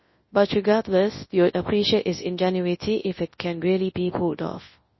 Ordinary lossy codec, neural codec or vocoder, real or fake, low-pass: MP3, 24 kbps; codec, 24 kHz, 0.9 kbps, WavTokenizer, large speech release; fake; 7.2 kHz